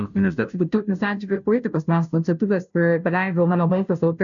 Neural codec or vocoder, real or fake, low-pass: codec, 16 kHz, 0.5 kbps, FunCodec, trained on Chinese and English, 25 frames a second; fake; 7.2 kHz